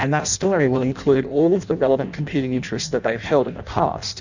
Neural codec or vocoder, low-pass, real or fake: codec, 16 kHz in and 24 kHz out, 0.6 kbps, FireRedTTS-2 codec; 7.2 kHz; fake